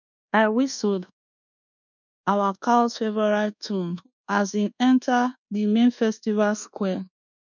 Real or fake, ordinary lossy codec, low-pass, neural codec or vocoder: fake; none; 7.2 kHz; codec, 24 kHz, 1.2 kbps, DualCodec